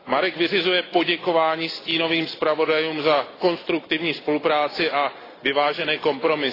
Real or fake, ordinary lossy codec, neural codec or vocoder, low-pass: real; AAC, 24 kbps; none; 5.4 kHz